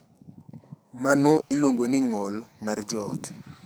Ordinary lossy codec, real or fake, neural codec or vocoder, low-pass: none; fake; codec, 44.1 kHz, 2.6 kbps, SNAC; none